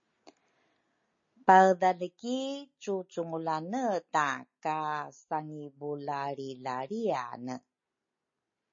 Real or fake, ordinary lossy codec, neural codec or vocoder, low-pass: real; MP3, 32 kbps; none; 7.2 kHz